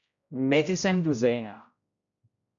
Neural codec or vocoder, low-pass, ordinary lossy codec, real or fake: codec, 16 kHz, 0.5 kbps, X-Codec, HuBERT features, trained on general audio; 7.2 kHz; AAC, 64 kbps; fake